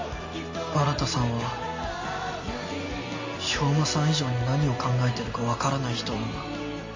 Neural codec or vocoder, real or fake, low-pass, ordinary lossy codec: none; real; 7.2 kHz; MP3, 32 kbps